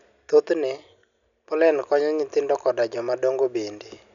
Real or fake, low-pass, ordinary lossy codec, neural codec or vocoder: real; 7.2 kHz; none; none